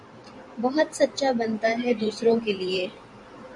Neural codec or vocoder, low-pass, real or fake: vocoder, 44.1 kHz, 128 mel bands every 512 samples, BigVGAN v2; 10.8 kHz; fake